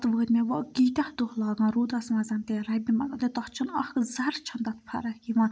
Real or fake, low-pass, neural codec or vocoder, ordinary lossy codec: real; none; none; none